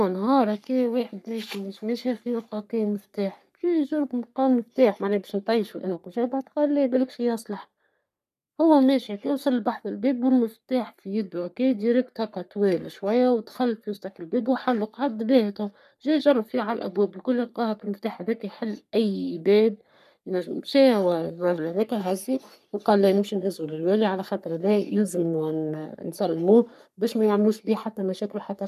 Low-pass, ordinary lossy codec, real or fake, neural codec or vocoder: 14.4 kHz; none; fake; codec, 44.1 kHz, 3.4 kbps, Pupu-Codec